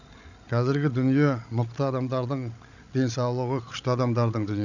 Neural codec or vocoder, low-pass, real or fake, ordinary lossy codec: codec, 16 kHz, 16 kbps, FreqCodec, larger model; 7.2 kHz; fake; none